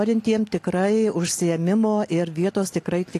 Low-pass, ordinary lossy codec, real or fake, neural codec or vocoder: 14.4 kHz; AAC, 48 kbps; real; none